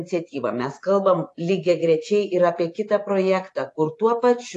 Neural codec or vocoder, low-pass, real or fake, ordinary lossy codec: vocoder, 24 kHz, 100 mel bands, Vocos; 10.8 kHz; fake; AAC, 48 kbps